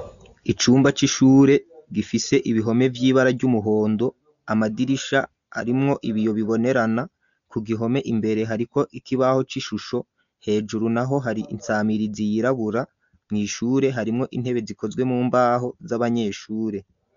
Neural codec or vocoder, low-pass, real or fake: none; 7.2 kHz; real